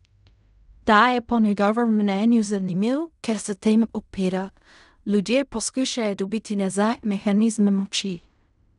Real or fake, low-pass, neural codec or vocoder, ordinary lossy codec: fake; 10.8 kHz; codec, 16 kHz in and 24 kHz out, 0.4 kbps, LongCat-Audio-Codec, fine tuned four codebook decoder; none